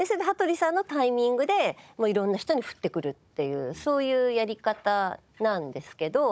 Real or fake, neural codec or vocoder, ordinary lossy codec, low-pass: fake; codec, 16 kHz, 16 kbps, FunCodec, trained on Chinese and English, 50 frames a second; none; none